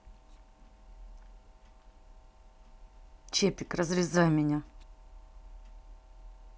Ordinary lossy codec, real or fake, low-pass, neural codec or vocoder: none; real; none; none